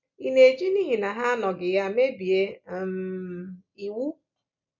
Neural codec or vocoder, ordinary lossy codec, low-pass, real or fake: none; none; 7.2 kHz; real